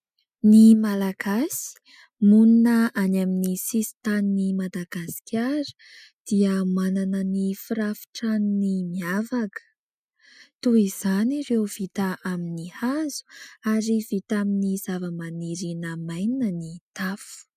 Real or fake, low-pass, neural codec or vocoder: real; 14.4 kHz; none